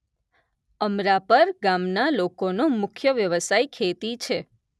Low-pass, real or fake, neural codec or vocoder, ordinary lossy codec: none; real; none; none